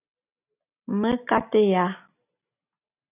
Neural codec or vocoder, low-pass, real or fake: none; 3.6 kHz; real